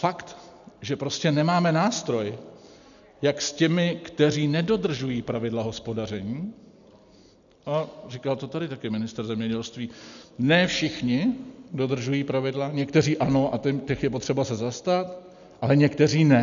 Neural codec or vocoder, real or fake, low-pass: none; real; 7.2 kHz